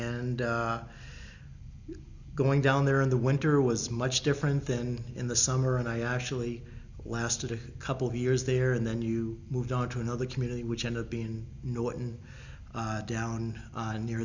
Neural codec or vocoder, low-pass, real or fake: none; 7.2 kHz; real